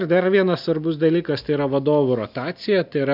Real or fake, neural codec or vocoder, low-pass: real; none; 5.4 kHz